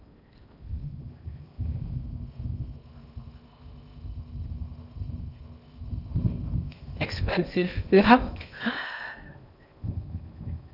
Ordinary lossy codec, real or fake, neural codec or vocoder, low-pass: none; fake; codec, 16 kHz in and 24 kHz out, 0.6 kbps, FocalCodec, streaming, 2048 codes; 5.4 kHz